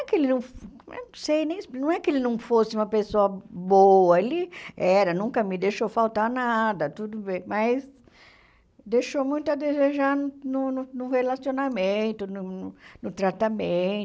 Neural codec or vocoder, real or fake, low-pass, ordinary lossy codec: none; real; none; none